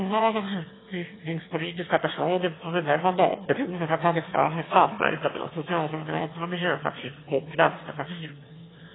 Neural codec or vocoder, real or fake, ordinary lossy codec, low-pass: autoencoder, 22.05 kHz, a latent of 192 numbers a frame, VITS, trained on one speaker; fake; AAC, 16 kbps; 7.2 kHz